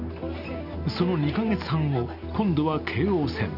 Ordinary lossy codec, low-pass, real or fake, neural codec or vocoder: none; 5.4 kHz; real; none